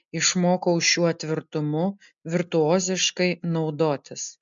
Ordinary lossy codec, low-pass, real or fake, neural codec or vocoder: MP3, 64 kbps; 7.2 kHz; real; none